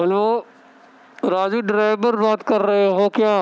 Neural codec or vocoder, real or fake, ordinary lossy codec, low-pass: none; real; none; none